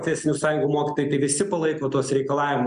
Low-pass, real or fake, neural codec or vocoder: 9.9 kHz; real; none